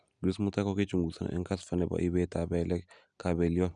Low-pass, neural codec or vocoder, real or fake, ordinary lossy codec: 9.9 kHz; none; real; none